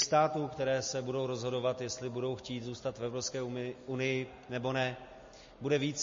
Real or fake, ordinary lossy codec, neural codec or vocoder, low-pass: real; MP3, 32 kbps; none; 7.2 kHz